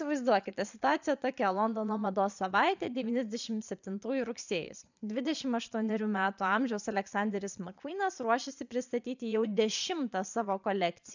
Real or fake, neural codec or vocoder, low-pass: fake; vocoder, 22.05 kHz, 80 mel bands, Vocos; 7.2 kHz